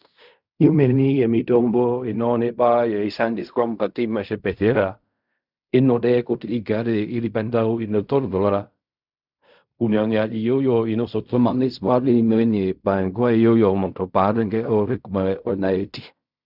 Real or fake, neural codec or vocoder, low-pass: fake; codec, 16 kHz in and 24 kHz out, 0.4 kbps, LongCat-Audio-Codec, fine tuned four codebook decoder; 5.4 kHz